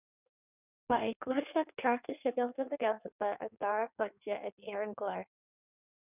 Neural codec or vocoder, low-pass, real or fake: codec, 16 kHz in and 24 kHz out, 1.1 kbps, FireRedTTS-2 codec; 3.6 kHz; fake